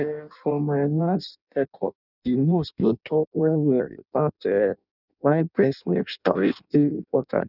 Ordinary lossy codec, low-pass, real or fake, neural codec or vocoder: none; 5.4 kHz; fake; codec, 16 kHz in and 24 kHz out, 0.6 kbps, FireRedTTS-2 codec